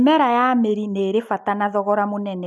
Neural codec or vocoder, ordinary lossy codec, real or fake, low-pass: none; none; real; none